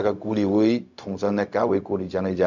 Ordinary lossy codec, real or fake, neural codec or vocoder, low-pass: none; fake; codec, 16 kHz, 0.4 kbps, LongCat-Audio-Codec; 7.2 kHz